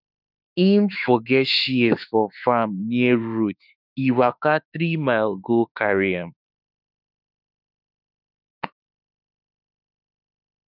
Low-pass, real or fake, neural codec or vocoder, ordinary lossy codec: 5.4 kHz; fake; autoencoder, 48 kHz, 32 numbers a frame, DAC-VAE, trained on Japanese speech; none